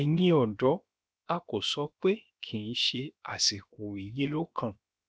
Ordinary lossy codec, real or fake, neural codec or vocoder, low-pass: none; fake; codec, 16 kHz, 0.7 kbps, FocalCodec; none